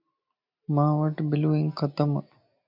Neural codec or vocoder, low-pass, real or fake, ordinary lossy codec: none; 5.4 kHz; real; MP3, 48 kbps